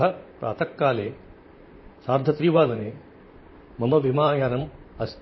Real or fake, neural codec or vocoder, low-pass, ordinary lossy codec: fake; vocoder, 44.1 kHz, 128 mel bands, Pupu-Vocoder; 7.2 kHz; MP3, 24 kbps